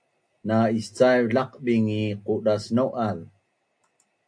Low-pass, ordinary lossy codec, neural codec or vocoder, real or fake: 9.9 kHz; AAC, 64 kbps; none; real